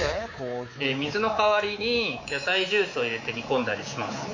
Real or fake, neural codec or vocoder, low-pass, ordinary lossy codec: fake; codec, 24 kHz, 3.1 kbps, DualCodec; 7.2 kHz; AAC, 48 kbps